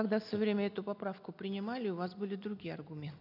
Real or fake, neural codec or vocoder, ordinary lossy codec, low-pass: real; none; none; 5.4 kHz